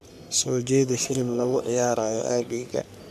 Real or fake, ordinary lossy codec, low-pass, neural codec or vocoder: fake; none; 14.4 kHz; codec, 44.1 kHz, 3.4 kbps, Pupu-Codec